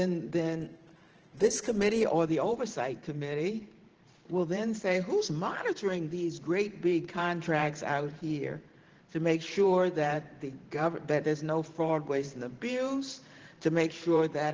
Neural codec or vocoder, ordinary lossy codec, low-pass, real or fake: vocoder, 44.1 kHz, 128 mel bands every 512 samples, BigVGAN v2; Opus, 16 kbps; 7.2 kHz; fake